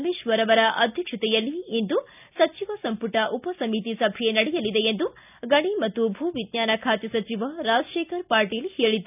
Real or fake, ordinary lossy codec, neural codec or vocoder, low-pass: real; none; none; 3.6 kHz